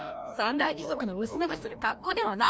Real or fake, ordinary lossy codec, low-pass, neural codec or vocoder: fake; none; none; codec, 16 kHz, 1 kbps, FreqCodec, larger model